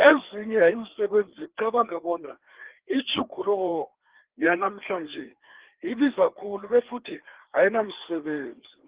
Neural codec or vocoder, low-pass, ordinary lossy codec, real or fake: codec, 16 kHz, 2 kbps, FreqCodec, larger model; 3.6 kHz; Opus, 16 kbps; fake